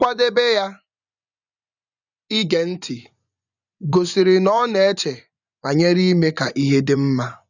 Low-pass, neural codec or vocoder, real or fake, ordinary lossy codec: 7.2 kHz; vocoder, 24 kHz, 100 mel bands, Vocos; fake; none